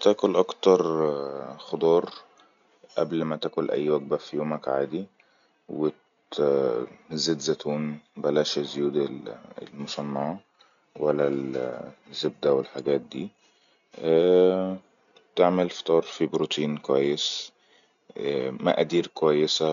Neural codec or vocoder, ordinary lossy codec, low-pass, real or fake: none; none; 7.2 kHz; real